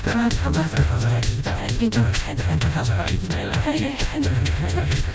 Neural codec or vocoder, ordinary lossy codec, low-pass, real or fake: codec, 16 kHz, 0.5 kbps, FreqCodec, smaller model; none; none; fake